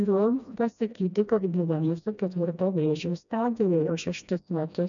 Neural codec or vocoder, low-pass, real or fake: codec, 16 kHz, 1 kbps, FreqCodec, smaller model; 7.2 kHz; fake